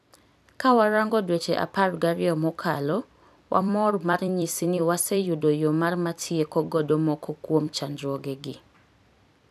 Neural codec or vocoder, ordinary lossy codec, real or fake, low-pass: vocoder, 44.1 kHz, 128 mel bands every 256 samples, BigVGAN v2; none; fake; 14.4 kHz